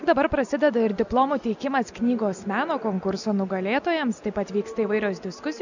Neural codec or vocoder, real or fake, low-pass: none; real; 7.2 kHz